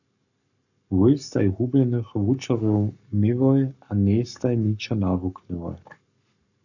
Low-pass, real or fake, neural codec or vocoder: 7.2 kHz; fake; codec, 44.1 kHz, 7.8 kbps, Pupu-Codec